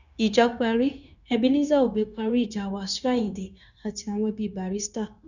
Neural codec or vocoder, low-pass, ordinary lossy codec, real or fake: codec, 16 kHz, 0.9 kbps, LongCat-Audio-Codec; 7.2 kHz; none; fake